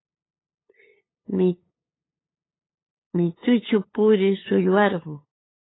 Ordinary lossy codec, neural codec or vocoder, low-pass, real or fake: AAC, 16 kbps; codec, 16 kHz, 8 kbps, FunCodec, trained on LibriTTS, 25 frames a second; 7.2 kHz; fake